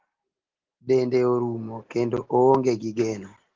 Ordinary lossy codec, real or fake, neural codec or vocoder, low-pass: Opus, 16 kbps; real; none; 7.2 kHz